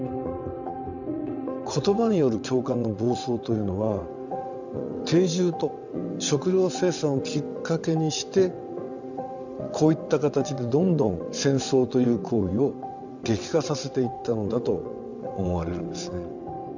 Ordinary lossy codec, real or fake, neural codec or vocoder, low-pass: none; fake; vocoder, 22.05 kHz, 80 mel bands, WaveNeXt; 7.2 kHz